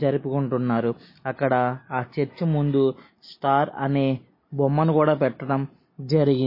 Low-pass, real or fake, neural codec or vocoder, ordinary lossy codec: 5.4 kHz; real; none; MP3, 24 kbps